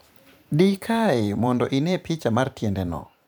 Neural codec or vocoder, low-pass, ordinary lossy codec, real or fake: vocoder, 44.1 kHz, 128 mel bands every 512 samples, BigVGAN v2; none; none; fake